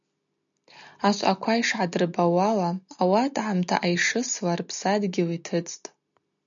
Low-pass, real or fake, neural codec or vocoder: 7.2 kHz; real; none